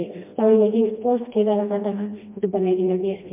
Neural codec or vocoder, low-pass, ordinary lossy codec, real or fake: codec, 16 kHz, 1 kbps, FreqCodec, smaller model; 3.6 kHz; MP3, 32 kbps; fake